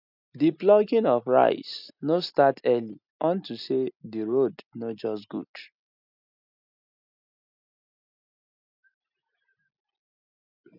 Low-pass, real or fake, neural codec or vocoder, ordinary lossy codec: 5.4 kHz; real; none; none